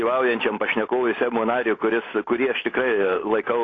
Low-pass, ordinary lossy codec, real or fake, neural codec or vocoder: 7.2 kHz; AAC, 32 kbps; real; none